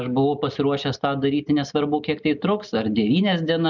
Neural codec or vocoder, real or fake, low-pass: none; real; 7.2 kHz